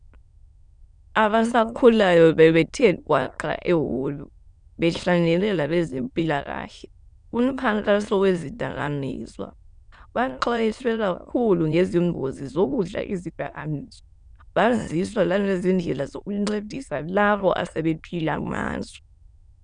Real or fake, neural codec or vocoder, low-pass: fake; autoencoder, 22.05 kHz, a latent of 192 numbers a frame, VITS, trained on many speakers; 9.9 kHz